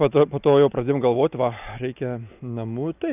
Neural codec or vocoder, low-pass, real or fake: none; 3.6 kHz; real